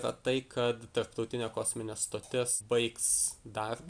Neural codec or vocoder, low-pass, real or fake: none; 9.9 kHz; real